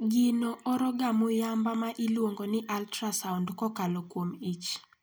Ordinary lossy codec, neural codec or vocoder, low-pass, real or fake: none; none; none; real